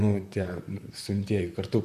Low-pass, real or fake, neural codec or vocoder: 14.4 kHz; fake; vocoder, 44.1 kHz, 128 mel bands, Pupu-Vocoder